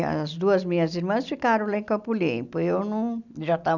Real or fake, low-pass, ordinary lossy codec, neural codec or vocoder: real; 7.2 kHz; none; none